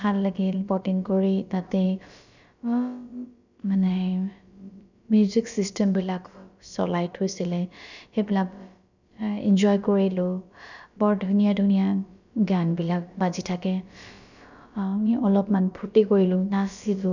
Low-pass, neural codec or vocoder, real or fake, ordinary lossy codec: 7.2 kHz; codec, 16 kHz, about 1 kbps, DyCAST, with the encoder's durations; fake; none